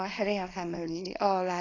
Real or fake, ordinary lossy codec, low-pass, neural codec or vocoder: fake; AAC, 32 kbps; 7.2 kHz; codec, 24 kHz, 0.9 kbps, WavTokenizer, small release